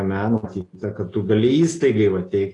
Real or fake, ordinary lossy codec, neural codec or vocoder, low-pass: real; AAC, 32 kbps; none; 10.8 kHz